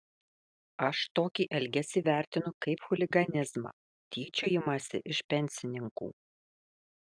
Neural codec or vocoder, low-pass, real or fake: none; 9.9 kHz; real